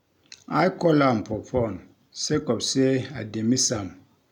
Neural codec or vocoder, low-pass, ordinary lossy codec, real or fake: none; 19.8 kHz; none; real